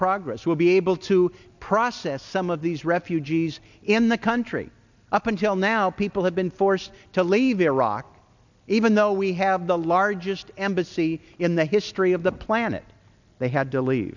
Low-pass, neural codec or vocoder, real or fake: 7.2 kHz; none; real